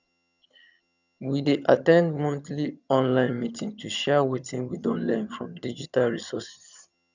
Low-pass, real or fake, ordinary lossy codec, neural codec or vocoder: 7.2 kHz; fake; none; vocoder, 22.05 kHz, 80 mel bands, HiFi-GAN